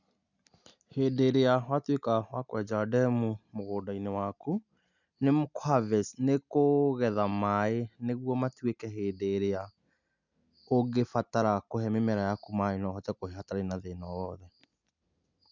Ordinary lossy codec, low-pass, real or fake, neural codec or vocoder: none; 7.2 kHz; real; none